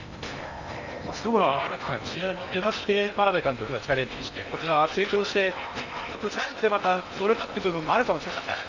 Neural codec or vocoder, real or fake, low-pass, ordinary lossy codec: codec, 16 kHz in and 24 kHz out, 0.8 kbps, FocalCodec, streaming, 65536 codes; fake; 7.2 kHz; none